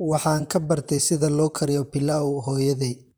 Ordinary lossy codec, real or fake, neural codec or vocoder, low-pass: none; fake; vocoder, 44.1 kHz, 128 mel bands, Pupu-Vocoder; none